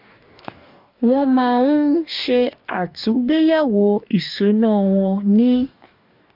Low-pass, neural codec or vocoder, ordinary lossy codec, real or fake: 5.4 kHz; codec, 44.1 kHz, 2.6 kbps, DAC; MP3, 48 kbps; fake